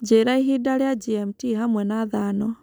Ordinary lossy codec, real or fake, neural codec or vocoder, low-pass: none; real; none; none